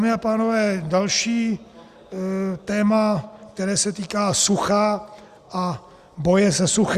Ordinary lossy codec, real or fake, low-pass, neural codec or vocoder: Opus, 64 kbps; real; 14.4 kHz; none